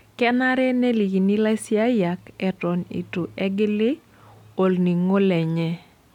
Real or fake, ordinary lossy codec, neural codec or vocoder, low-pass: real; none; none; 19.8 kHz